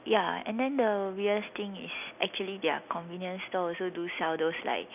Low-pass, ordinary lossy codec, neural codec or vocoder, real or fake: 3.6 kHz; none; none; real